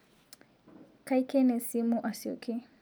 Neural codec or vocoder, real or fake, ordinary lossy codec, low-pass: none; real; none; none